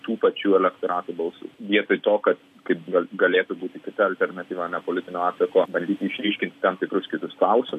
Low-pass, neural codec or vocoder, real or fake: 14.4 kHz; none; real